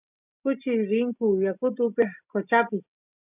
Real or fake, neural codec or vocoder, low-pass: real; none; 3.6 kHz